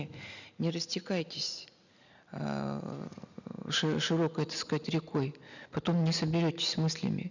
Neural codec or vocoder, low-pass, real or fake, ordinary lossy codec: none; 7.2 kHz; real; none